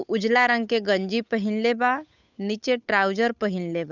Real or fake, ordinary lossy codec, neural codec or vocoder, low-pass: fake; none; codec, 16 kHz, 8 kbps, FunCodec, trained on Chinese and English, 25 frames a second; 7.2 kHz